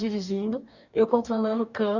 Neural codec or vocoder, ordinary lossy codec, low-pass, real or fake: codec, 44.1 kHz, 2.6 kbps, DAC; none; 7.2 kHz; fake